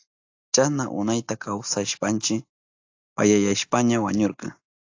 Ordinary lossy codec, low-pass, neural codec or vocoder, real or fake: AAC, 48 kbps; 7.2 kHz; none; real